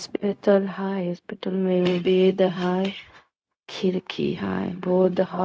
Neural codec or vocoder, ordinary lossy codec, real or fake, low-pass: codec, 16 kHz, 0.4 kbps, LongCat-Audio-Codec; none; fake; none